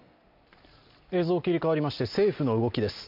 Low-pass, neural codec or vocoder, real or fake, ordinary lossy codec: 5.4 kHz; none; real; MP3, 48 kbps